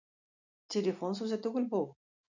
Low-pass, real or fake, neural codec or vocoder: 7.2 kHz; real; none